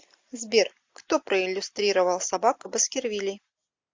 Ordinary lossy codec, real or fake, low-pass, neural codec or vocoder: MP3, 48 kbps; real; 7.2 kHz; none